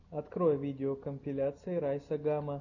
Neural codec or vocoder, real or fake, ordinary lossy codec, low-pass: none; real; AAC, 48 kbps; 7.2 kHz